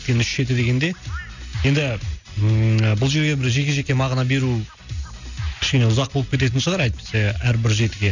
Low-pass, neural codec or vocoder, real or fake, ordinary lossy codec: 7.2 kHz; none; real; none